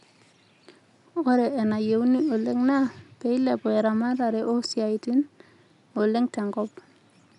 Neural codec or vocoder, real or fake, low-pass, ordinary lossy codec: none; real; 10.8 kHz; none